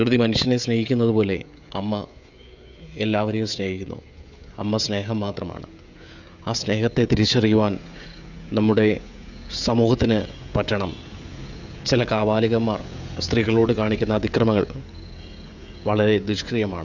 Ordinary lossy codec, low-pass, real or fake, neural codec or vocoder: none; 7.2 kHz; fake; codec, 16 kHz, 16 kbps, FreqCodec, smaller model